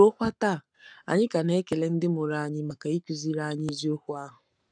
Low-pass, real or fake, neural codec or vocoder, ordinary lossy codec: 9.9 kHz; fake; codec, 44.1 kHz, 7.8 kbps, Pupu-Codec; none